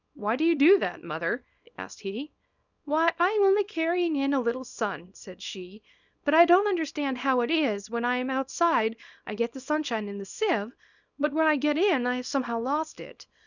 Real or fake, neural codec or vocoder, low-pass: fake; codec, 24 kHz, 0.9 kbps, WavTokenizer, small release; 7.2 kHz